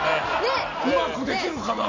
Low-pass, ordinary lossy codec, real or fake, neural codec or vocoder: 7.2 kHz; AAC, 32 kbps; real; none